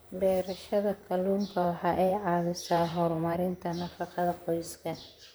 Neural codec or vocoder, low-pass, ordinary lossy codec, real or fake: vocoder, 44.1 kHz, 128 mel bands, Pupu-Vocoder; none; none; fake